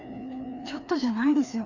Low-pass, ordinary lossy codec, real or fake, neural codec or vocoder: 7.2 kHz; none; fake; codec, 16 kHz, 2 kbps, FreqCodec, larger model